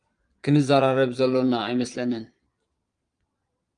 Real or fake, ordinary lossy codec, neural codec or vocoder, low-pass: fake; Opus, 32 kbps; vocoder, 22.05 kHz, 80 mel bands, Vocos; 9.9 kHz